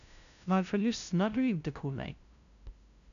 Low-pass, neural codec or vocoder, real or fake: 7.2 kHz; codec, 16 kHz, 0.5 kbps, FunCodec, trained on LibriTTS, 25 frames a second; fake